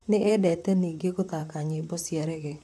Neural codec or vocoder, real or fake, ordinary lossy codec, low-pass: vocoder, 44.1 kHz, 128 mel bands, Pupu-Vocoder; fake; none; 14.4 kHz